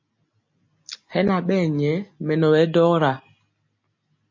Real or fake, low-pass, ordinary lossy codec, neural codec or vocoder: real; 7.2 kHz; MP3, 32 kbps; none